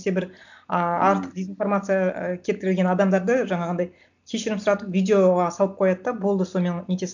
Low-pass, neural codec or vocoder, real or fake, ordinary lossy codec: 7.2 kHz; none; real; none